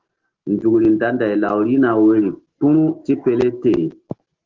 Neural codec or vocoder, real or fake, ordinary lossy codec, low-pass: none; real; Opus, 16 kbps; 7.2 kHz